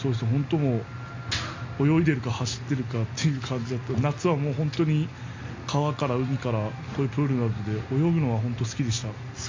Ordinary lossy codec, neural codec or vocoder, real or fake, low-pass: MP3, 48 kbps; none; real; 7.2 kHz